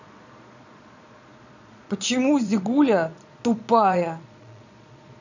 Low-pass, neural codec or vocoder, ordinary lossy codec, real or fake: 7.2 kHz; vocoder, 22.05 kHz, 80 mel bands, Vocos; none; fake